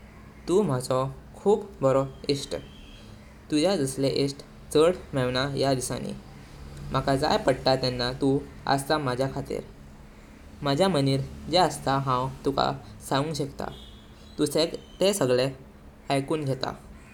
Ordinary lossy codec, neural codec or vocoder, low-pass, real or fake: none; none; 19.8 kHz; real